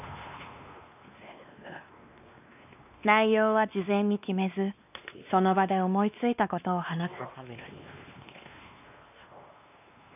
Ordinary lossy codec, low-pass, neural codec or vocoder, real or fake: none; 3.6 kHz; codec, 16 kHz, 2 kbps, X-Codec, WavLM features, trained on Multilingual LibriSpeech; fake